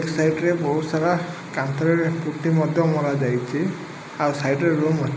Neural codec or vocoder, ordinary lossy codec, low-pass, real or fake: none; none; none; real